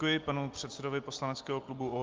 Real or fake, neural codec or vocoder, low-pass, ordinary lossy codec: real; none; 7.2 kHz; Opus, 32 kbps